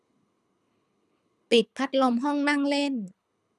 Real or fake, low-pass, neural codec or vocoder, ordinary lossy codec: fake; none; codec, 24 kHz, 6 kbps, HILCodec; none